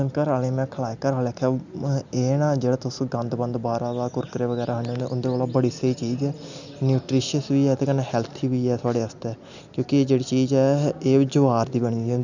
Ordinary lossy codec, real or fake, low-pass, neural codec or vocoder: none; real; 7.2 kHz; none